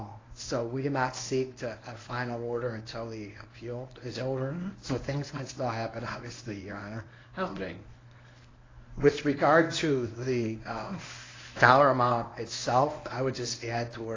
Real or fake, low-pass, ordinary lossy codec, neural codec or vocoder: fake; 7.2 kHz; AAC, 32 kbps; codec, 24 kHz, 0.9 kbps, WavTokenizer, medium speech release version 1